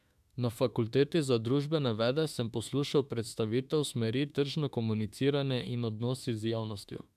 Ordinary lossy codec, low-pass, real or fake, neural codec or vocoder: none; 14.4 kHz; fake; autoencoder, 48 kHz, 32 numbers a frame, DAC-VAE, trained on Japanese speech